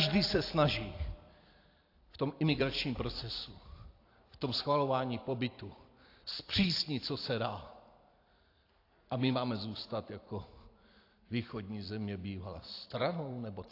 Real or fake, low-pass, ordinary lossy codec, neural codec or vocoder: fake; 5.4 kHz; AAC, 32 kbps; vocoder, 44.1 kHz, 128 mel bands every 256 samples, BigVGAN v2